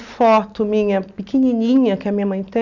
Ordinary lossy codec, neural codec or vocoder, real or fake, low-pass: none; none; real; 7.2 kHz